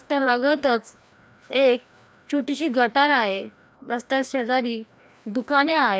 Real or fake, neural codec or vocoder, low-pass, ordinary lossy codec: fake; codec, 16 kHz, 1 kbps, FreqCodec, larger model; none; none